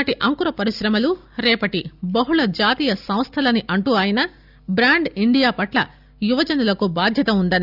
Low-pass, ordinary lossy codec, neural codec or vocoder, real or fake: 5.4 kHz; Opus, 64 kbps; none; real